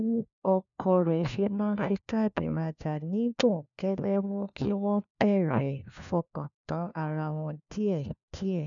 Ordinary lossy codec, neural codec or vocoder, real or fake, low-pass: none; codec, 16 kHz, 1 kbps, FunCodec, trained on LibriTTS, 50 frames a second; fake; 7.2 kHz